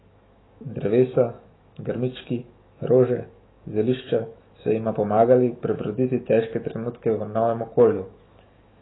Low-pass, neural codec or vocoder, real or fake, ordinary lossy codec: 7.2 kHz; autoencoder, 48 kHz, 128 numbers a frame, DAC-VAE, trained on Japanese speech; fake; AAC, 16 kbps